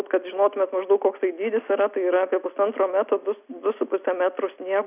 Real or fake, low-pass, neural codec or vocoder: real; 3.6 kHz; none